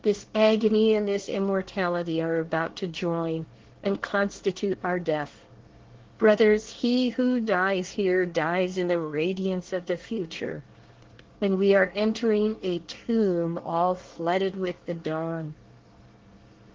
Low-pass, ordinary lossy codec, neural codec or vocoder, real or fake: 7.2 kHz; Opus, 16 kbps; codec, 24 kHz, 1 kbps, SNAC; fake